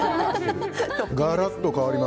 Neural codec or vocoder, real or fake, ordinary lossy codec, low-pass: none; real; none; none